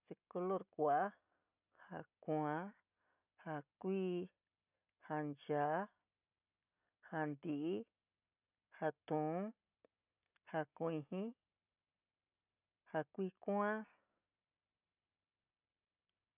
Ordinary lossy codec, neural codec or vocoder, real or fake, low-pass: none; none; real; 3.6 kHz